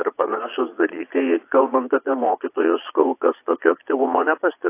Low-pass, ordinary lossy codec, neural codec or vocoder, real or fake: 3.6 kHz; AAC, 24 kbps; vocoder, 22.05 kHz, 80 mel bands, Vocos; fake